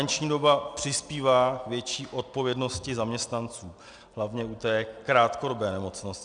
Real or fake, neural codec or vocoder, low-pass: real; none; 9.9 kHz